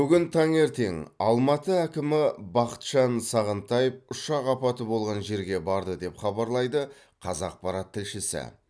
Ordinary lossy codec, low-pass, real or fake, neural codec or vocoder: none; none; real; none